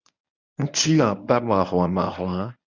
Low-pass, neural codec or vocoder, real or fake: 7.2 kHz; codec, 24 kHz, 0.9 kbps, WavTokenizer, medium speech release version 1; fake